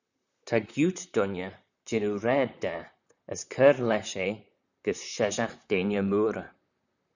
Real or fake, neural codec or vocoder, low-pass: fake; vocoder, 44.1 kHz, 128 mel bands, Pupu-Vocoder; 7.2 kHz